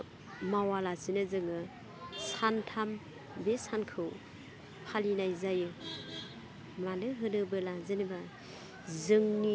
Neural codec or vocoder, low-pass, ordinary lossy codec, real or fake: none; none; none; real